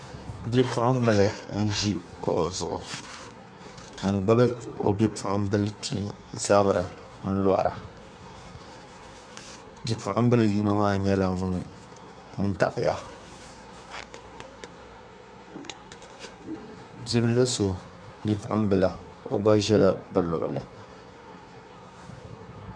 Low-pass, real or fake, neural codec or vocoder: 9.9 kHz; fake; codec, 24 kHz, 1 kbps, SNAC